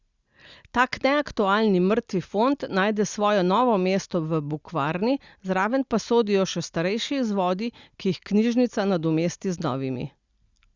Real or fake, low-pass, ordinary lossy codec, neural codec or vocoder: real; 7.2 kHz; Opus, 64 kbps; none